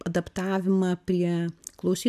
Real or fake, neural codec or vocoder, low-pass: real; none; 14.4 kHz